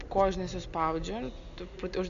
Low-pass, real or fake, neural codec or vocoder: 7.2 kHz; real; none